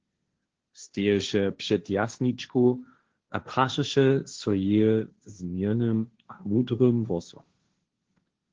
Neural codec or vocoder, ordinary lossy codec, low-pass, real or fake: codec, 16 kHz, 1.1 kbps, Voila-Tokenizer; Opus, 16 kbps; 7.2 kHz; fake